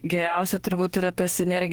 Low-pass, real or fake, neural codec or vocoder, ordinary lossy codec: 19.8 kHz; fake; codec, 44.1 kHz, 2.6 kbps, DAC; Opus, 24 kbps